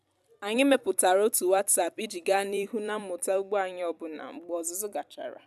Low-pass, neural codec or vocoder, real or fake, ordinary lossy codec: 14.4 kHz; vocoder, 44.1 kHz, 128 mel bands every 256 samples, BigVGAN v2; fake; none